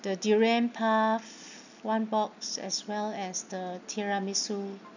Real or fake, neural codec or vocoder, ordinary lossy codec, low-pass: real; none; none; 7.2 kHz